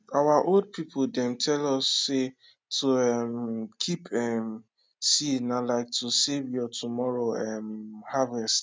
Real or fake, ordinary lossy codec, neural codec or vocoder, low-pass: real; none; none; none